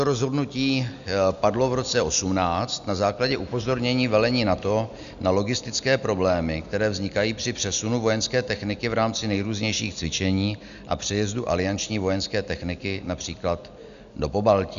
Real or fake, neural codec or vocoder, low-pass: real; none; 7.2 kHz